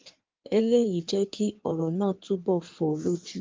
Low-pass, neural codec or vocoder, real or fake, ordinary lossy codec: 7.2 kHz; codec, 16 kHz in and 24 kHz out, 1.1 kbps, FireRedTTS-2 codec; fake; Opus, 24 kbps